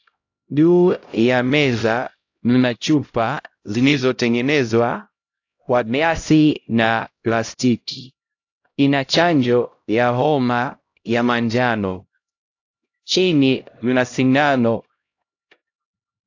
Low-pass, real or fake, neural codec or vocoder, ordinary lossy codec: 7.2 kHz; fake; codec, 16 kHz, 0.5 kbps, X-Codec, HuBERT features, trained on LibriSpeech; AAC, 48 kbps